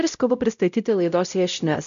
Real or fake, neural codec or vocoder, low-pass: fake; codec, 16 kHz, 0.5 kbps, X-Codec, WavLM features, trained on Multilingual LibriSpeech; 7.2 kHz